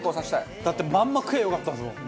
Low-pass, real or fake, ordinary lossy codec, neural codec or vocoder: none; real; none; none